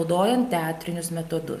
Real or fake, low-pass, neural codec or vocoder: real; 14.4 kHz; none